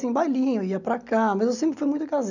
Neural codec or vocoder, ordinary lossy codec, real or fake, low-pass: none; none; real; 7.2 kHz